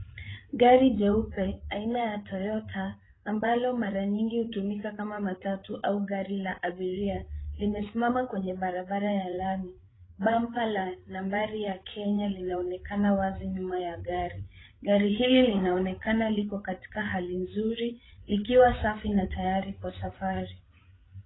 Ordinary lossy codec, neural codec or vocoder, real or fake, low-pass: AAC, 16 kbps; codec, 16 kHz, 8 kbps, FreqCodec, larger model; fake; 7.2 kHz